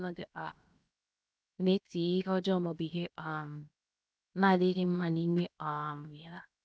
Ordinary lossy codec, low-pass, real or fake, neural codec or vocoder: none; none; fake; codec, 16 kHz, 0.7 kbps, FocalCodec